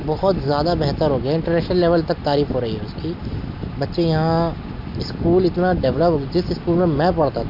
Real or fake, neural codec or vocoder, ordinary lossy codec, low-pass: real; none; MP3, 48 kbps; 5.4 kHz